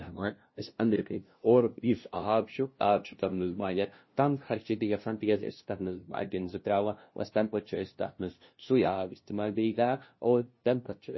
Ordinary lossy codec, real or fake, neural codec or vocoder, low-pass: MP3, 24 kbps; fake; codec, 16 kHz, 0.5 kbps, FunCodec, trained on LibriTTS, 25 frames a second; 7.2 kHz